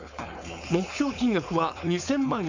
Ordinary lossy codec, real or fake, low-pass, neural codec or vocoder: MP3, 48 kbps; fake; 7.2 kHz; codec, 16 kHz, 4.8 kbps, FACodec